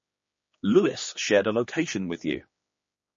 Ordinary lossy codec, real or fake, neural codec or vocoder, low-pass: MP3, 32 kbps; fake; codec, 16 kHz, 2 kbps, X-Codec, HuBERT features, trained on general audio; 7.2 kHz